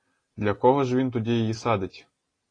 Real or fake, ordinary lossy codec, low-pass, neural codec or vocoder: real; AAC, 32 kbps; 9.9 kHz; none